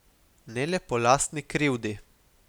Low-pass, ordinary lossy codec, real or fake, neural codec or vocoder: none; none; real; none